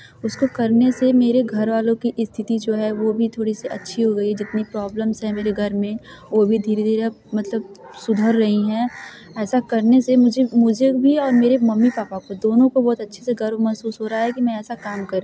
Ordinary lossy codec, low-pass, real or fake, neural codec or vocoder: none; none; real; none